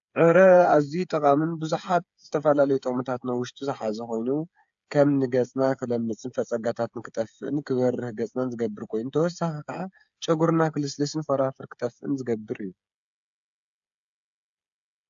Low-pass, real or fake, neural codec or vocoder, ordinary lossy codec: 7.2 kHz; fake; codec, 16 kHz, 8 kbps, FreqCodec, smaller model; AAC, 64 kbps